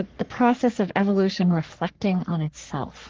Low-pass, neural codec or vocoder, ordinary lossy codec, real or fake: 7.2 kHz; codec, 44.1 kHz, 3.4 kbps, Pupu-Codec; Opus, 16 kbps; fake